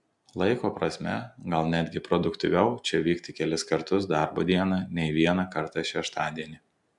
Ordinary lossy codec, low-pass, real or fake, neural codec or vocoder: MP3, 96 kbps; 10.8 kHz; real; none